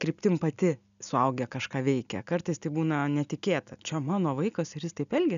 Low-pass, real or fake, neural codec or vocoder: 7.2 kHz; real; none